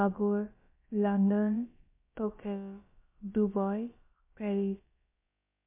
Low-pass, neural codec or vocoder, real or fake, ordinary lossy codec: 3.6 kHz; codec, 16 kHz, about 1 kbps, DyCAST, with the encoder's durations; fake; MP3, 24 kbps